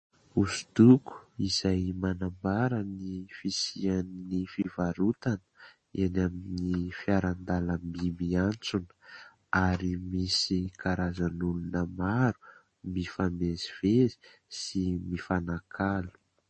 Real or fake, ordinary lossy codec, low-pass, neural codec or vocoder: real; MP3, 32 kbps; 10.8 kHz; none